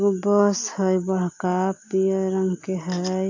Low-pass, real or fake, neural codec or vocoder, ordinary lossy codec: 7.2 kHz; real; none; AAC, 48 kbps